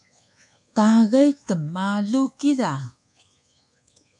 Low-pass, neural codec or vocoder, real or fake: 10.8 kHz; codec, 24 kHz, 1.2 kbps, DualCodec; fake